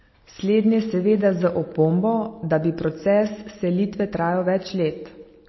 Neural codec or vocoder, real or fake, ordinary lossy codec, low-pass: none; real; MP3, 24 kbps; 7.2 kHz